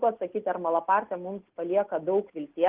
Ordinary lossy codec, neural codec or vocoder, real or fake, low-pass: Opus, 16 kbps; none; real; 3.6 kHz